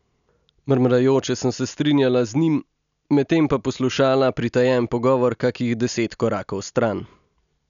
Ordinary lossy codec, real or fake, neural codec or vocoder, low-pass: none; real; none; 7.2 kHz